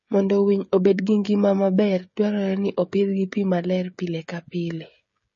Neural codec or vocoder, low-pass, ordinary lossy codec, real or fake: codec, 16 kHz, 16 kbps, FreqCodec, smaller model; 7.2 kHz; MP3, 32 kbps; fake